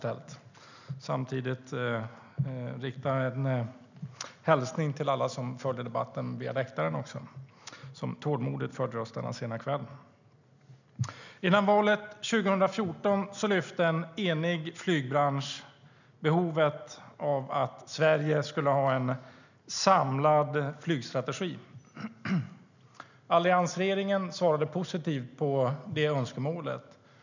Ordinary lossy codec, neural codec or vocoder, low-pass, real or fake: none; none; 7.2 kHz; real